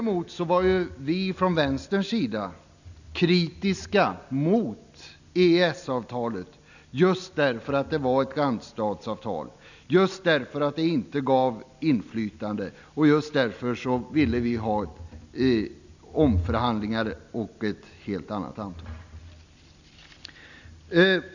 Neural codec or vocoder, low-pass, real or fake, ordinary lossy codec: none; 7.2 kHz; real; none